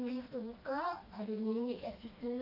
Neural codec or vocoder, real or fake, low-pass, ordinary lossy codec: codec, 16 kHz, 2 kbps, FreqCodec, smaller model; fake; 5.4 kHz; AAC, 32 kbps